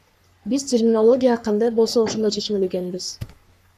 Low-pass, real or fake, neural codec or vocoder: 14.4 kHz; fake; codec, 44.1 kHz, 3.4 kbps, Pupu-Codec